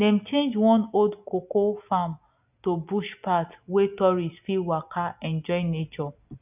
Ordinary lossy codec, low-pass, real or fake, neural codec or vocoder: none; 3.6 kHz; real; none